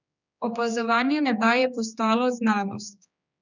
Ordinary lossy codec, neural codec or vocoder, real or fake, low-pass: none; codec, 16 kHz, 2 kbps, X-Codec, HuBERT features, trained on general audio; fake; 7.2 kHz